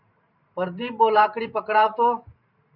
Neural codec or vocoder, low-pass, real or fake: vocoder, 44.1 kHz, 128 mel bands every 512 samples, BigVGAN v2; 5.4 kHz; fake